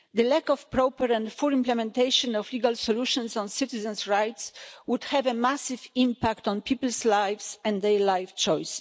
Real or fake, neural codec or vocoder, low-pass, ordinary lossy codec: real; none; none; none